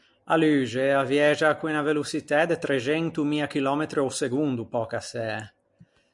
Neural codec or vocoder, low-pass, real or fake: none; 10.8 kHz; real